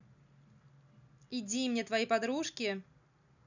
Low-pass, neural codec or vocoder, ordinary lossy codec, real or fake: 7.2 kHz; none; none; real